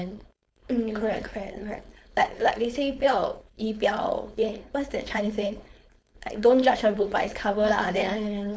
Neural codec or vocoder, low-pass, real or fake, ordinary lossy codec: codec, 16 kHz, 4.8 kbps, FACodec; none; fake; none